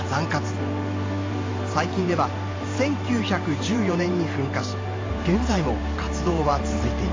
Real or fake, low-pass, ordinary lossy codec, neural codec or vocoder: fake; 7.2 kHz; AAC, 32 kbps; vocoder, 44.1 kHz, 128 mel bands every 512 samples, BigVGAN v2